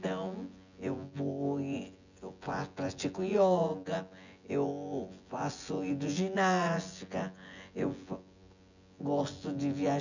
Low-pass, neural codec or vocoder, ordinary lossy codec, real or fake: 7.2 kHz; vocoder, 24 kHz, 100 mel bands, Vocos; none; fake